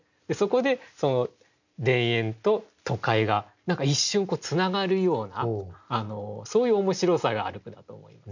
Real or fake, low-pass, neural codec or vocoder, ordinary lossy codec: real; 7.2 kHz; none; none